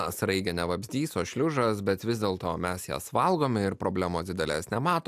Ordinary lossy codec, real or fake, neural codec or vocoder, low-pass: AAC, 96 kbps; real; none; 14.4 kHz